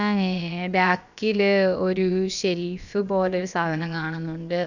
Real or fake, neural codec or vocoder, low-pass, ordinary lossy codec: fake; codec, 16 kHz, about 1 kbps, DyCAST, with the encoder's durations; 7.2 kHz; none